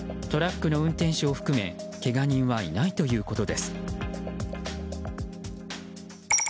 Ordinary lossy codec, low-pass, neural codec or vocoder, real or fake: none; none; none; real